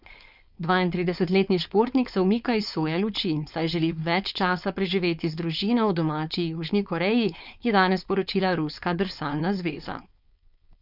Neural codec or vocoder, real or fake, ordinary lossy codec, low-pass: codec, 16 kHz, 4.8 kbps, FACodec; fake; none; 5.4 kHz